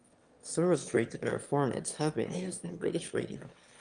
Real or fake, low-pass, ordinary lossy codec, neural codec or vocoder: fake; 9.9 kHz; Opus, 32 kbps; autoencoder, 22.05 kHz, a latent of 192 numbers a frame, VITS, trained on one speaker